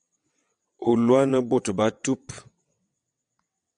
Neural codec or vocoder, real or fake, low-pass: vocoder, 22.05 kHz, 80 mel bands, WaveNeXt; fake; 9.9 kHz